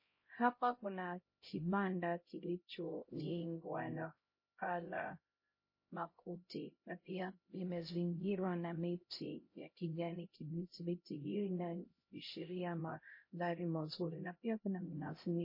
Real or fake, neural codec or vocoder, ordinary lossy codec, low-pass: fake; codec, 16 kHz, 0.5 kbps, X-Codec, HuBERT features, trained on LibriSpeech; MP3, 24 kbps; 5.4 kHz